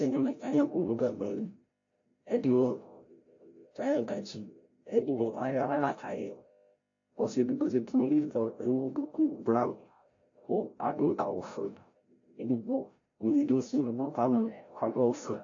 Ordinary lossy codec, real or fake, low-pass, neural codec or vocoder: AAC, 48 kbps; fake; 7.2 kHz; codec, 16 kHz, 0.5 kbps, FreqCodec, larger model